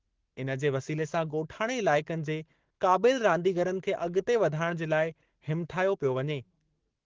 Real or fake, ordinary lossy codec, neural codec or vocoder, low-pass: fake; Opus, 16 kbps; codec, 44.1 kHz, 7.8 kbps, Pupu-Codec; 7.2 kHz